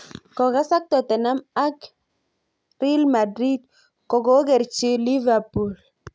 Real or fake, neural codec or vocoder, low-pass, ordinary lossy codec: real; none; none; none